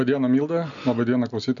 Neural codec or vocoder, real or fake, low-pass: none; real; 7.2 kHz